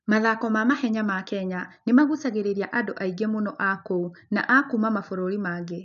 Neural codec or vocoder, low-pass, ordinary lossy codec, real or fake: none; 7.2 kHz; none; real